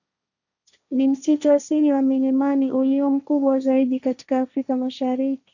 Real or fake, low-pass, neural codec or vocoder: fake; 7.2 kHz; codec, 16 kHz, 1.1 kbps, Voila-Tokenizer